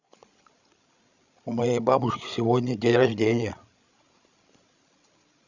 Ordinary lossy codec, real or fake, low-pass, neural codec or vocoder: none; fake; 7.2 kHz; codec, 16 kHz, 16 kbps, FreqCodec, larger model